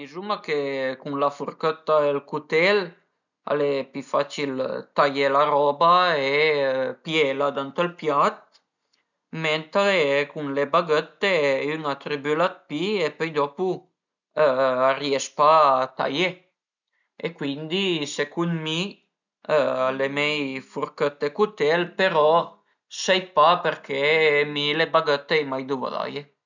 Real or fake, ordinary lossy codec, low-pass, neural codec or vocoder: real; none; 7.2 kHz; none